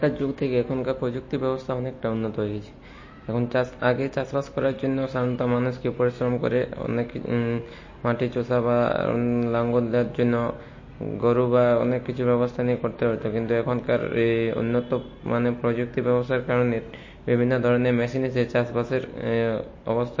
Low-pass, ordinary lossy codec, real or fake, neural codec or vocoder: 7.2 kHz; MP3, 32 kbps; real; none